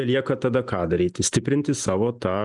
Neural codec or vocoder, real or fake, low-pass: none; real; 10.8 kHz